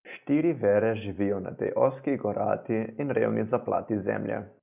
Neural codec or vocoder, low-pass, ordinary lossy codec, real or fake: none; 3.6 kHz; none; real